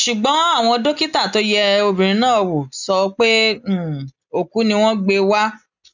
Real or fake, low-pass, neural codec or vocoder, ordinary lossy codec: real; 7.2 kHz; none; none